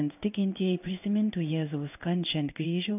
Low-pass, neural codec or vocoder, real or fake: 3.6 kHz; codec, 16 kHz in and 24 kHz out, 1 kbps, XY-Tokenizer; fake